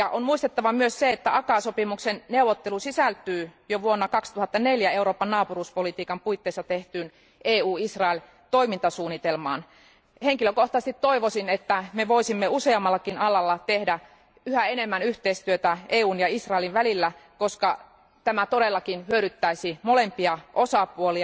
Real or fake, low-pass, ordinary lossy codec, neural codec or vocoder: real; none; none; none